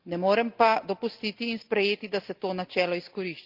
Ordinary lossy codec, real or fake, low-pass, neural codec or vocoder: Opus, 24 kbps; real; 5.4 kHz; none